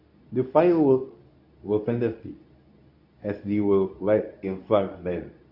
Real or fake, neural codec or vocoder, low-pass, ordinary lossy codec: fake; codec, 24 kHz, 0.9 kbps, WavTokenizer, medium speech release version 2; 5.4 kHz; none